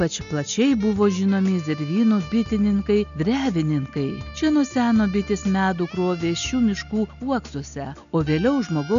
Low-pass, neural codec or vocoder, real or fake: 7.2 kHz; none; real